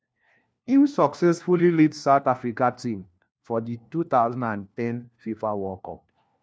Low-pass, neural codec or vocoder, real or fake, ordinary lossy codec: none; codec, 16 kHz, 1 kbps, FunCodec, trained on LibriTTS, 50 frames a second; fake; none